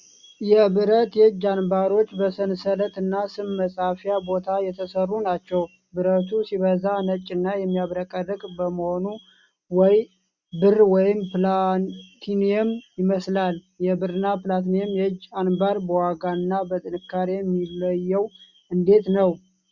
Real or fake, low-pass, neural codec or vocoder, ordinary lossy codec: real; 7.2 kHz; none; Opus, 64 kbps